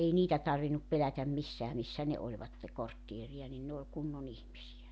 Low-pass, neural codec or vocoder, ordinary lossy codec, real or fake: none; none; none; real